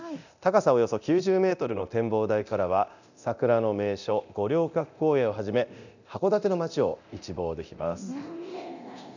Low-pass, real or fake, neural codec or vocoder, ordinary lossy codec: 7.2 kHz; fake; codec, 24 kHz, 0.9 kbps, DualCodec; none